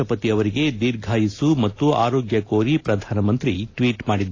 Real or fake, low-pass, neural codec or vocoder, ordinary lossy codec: real; 7.2 kHz; none; AAC, 32 kbps